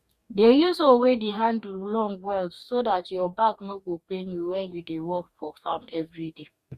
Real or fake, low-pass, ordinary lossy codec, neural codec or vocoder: fake; 14.4 kHz; Opus, 64 kbps; codec, 44.1 kHz, 2.6 kbps, DAC